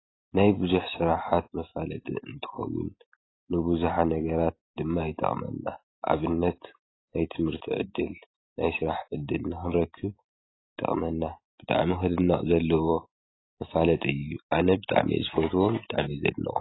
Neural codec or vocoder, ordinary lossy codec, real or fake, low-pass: none; AAC, 16 kbps; real; 7.2 kHz